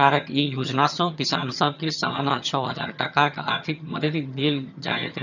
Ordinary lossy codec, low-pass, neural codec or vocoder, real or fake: none; 7.2 kHz; vocoder, 22.05 kHz, 80 mel bands, HiFi-GAN; fake